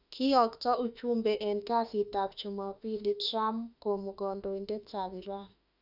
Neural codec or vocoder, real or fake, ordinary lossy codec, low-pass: autoencoder, 48 kHz, 32 numbers a frame, DAC-VAE, trained on Japanese speech; fake; none; 5.4 kHz